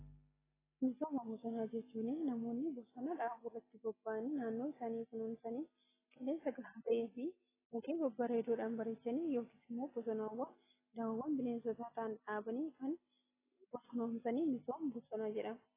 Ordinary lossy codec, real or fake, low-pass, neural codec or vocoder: AAC, 16 kbps; real; 3.6 kHz; none